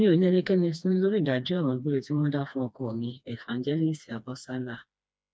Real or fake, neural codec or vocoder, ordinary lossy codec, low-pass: fake; codec, 16 kHz, 2 kbps, FreqCodec, smaller model; none; none